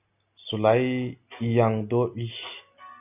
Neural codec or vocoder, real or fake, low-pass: none; real; 3.6 kHz